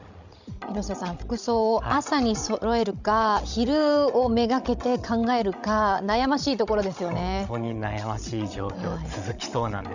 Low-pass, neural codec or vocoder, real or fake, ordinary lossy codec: 7.2 kHz; codec, 16 kHz, 16 kbps, FreqCodec, larger model; fake; none